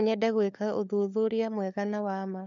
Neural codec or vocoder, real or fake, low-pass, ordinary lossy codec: codec, 16 kHz, 4 kbps, FunCodec, trained on LibriTTS, 50 frames a second; fake; 7.2 kHz; none